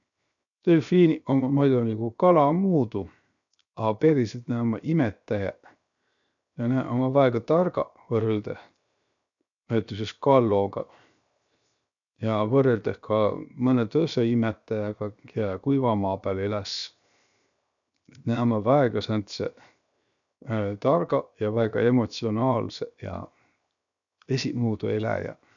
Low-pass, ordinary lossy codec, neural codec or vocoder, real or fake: 7.2 kHz; none; codec, 16 kHz, 0.7 kbps, FocalCodec; fake